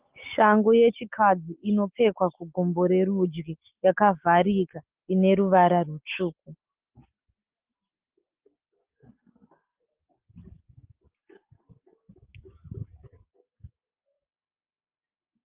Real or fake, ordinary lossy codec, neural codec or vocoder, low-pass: real; Opus, 32 kbps; none; 3.6 kHz